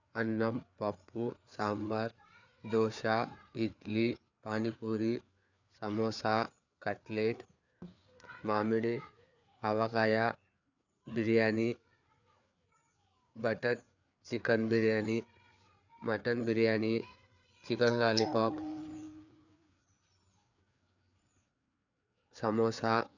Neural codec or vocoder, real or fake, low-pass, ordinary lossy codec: codec, 16 kHz, 4 kbps, FreqCodec, larger model; fake; 7.2 kHz; none